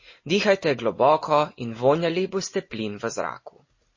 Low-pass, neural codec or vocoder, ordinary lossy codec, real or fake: 7.2 kHz; none; MP3, 32 kbps; real